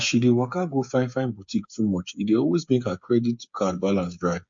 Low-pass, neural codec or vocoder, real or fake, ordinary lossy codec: 7.2 kHz; codec, 16 kHz, 8 kbps, FreqCodec, smaller model; fake; MP3, 48 kbps